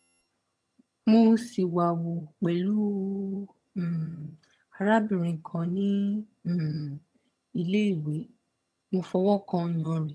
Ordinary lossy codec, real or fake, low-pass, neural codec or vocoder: none; fake; none; vocoder, 22.05 kHz, 80 mel bands, HiFi-GAN